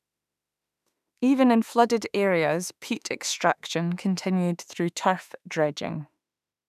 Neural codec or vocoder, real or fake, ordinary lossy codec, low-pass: autoencoder, 48 kHz, 32 numbers a frame, DAC-VAE, trained on Japanese speech; fake; none; 14.4 kHz